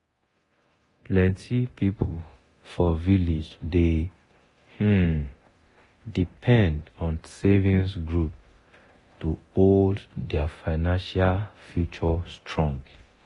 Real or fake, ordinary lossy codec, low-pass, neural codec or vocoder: fake; AAC, 32 kbps; 10.8 kHz; codec, 24 kHz, 0.9 kbps, DualCodec